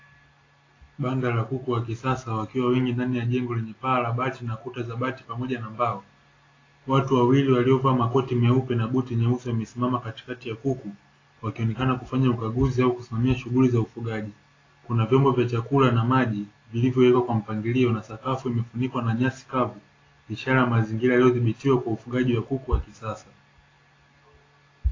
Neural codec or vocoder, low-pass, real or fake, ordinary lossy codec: none; 7.2 kHz; real; AAC, 32 kbps